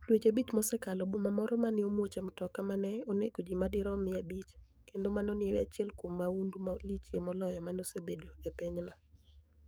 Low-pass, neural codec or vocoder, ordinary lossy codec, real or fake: none; codec, 44.1 kHz, 7.8 kbps, DAC; none; fake